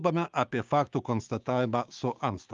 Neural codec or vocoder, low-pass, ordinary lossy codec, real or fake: codec, 16 kHz, 6 kbps, DAC; 7.2 kHz; Opus, 32 kbps; fake